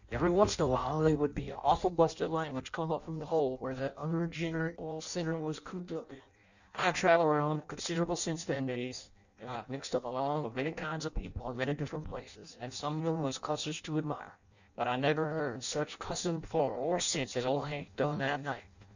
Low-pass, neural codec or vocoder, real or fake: 7.2 kHz; codec, 16 kHz in and 24 kHz out, 0.6 kbps, FireRedTTS-2 codec; fake